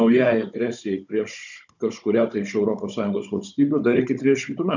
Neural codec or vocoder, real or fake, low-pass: codec, 16 kHz, 16 kbps, FunCodec, trained on Chinese and English, 50 frames a second; fake; 7.2 kHz